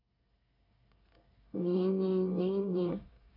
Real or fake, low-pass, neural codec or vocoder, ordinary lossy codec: fake; 5.4 kHz; codec, 24 kHz, 1 kbps, SNAC; none